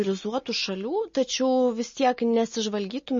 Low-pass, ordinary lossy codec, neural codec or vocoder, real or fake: 7.2 kHz; MP3, 32 kbps; none; real